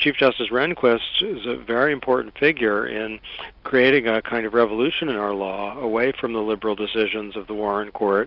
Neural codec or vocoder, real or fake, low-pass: none; real; 5.4 kHz